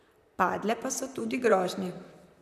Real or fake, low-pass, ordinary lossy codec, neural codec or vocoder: fake; 14.4 kHz; none; vocoder, 44.1 kHz, 128 mel bands, Pupu-Vocoder